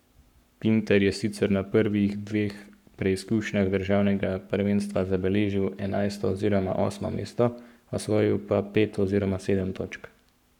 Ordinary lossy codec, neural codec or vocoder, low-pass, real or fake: none; codec, 44.1 kHz, 7.8 kbps, Pupu-Codec; 19.8 kHz; fake